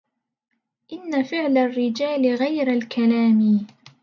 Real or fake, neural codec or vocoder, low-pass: real; none; 7.2 kHz